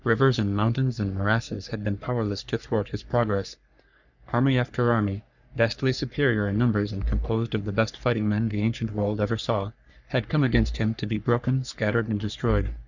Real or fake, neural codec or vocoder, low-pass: fake; codec, 44.1 kHz, 3.4 kbps, Pupu-Codec; 7.2 kHz